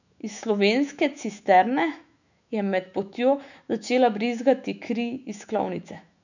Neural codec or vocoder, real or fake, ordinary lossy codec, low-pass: autoencoder, 48 kHz, 128 numbers a frame, DAC-VAE, trained on Japanese speech; fake; none; 7.2 kHz